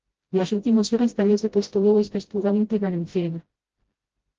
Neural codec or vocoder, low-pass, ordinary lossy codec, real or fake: codec, 16 kHz, 0.5 kbps, FreqCodec, smaller model; 7.2 kHz; Opus, 16 kbps; fake